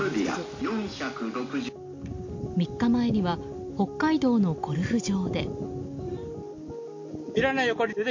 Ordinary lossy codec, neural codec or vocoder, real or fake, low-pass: MP3, 48 kbps; none; real; 7.2 kHz